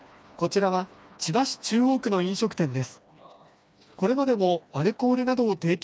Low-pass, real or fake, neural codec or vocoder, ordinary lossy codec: none; fake; codec, 16 kHz, 2 kbps, FreqCodec, smaller model; none